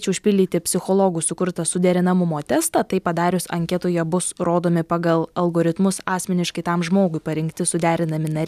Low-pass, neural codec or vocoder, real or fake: 14.4 kHz; none; real